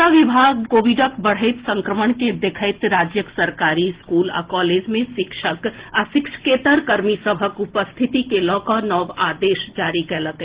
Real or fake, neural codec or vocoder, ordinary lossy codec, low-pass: real; none; Opus, 16 kbps; 3.6 kHz